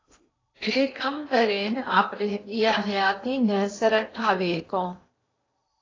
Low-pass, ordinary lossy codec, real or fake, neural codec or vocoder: 7.2 kHz; AAC, 32 kbps; fake; codec, 16 kHz in and 24 kHz out, 0.8 kbps, FocalCodec, streaming, 65536 codes